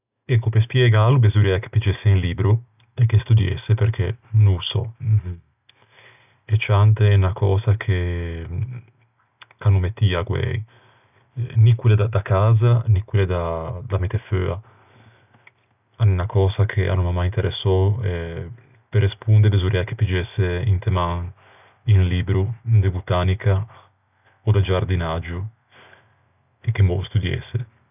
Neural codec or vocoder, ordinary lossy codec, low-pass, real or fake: none; none; 3.6 kHz; real